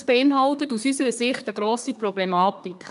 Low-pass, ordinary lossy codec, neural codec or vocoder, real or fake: 10.8 kHz; none; codec, 24 kHz, 1 kbps, SNAC; fake